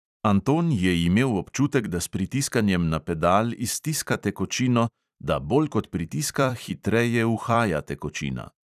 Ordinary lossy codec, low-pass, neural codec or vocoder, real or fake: none; 14.4 kHz; vocoder, 44.1 kHz, 128 mel bands every 256 samples, BigVGAN v2; fake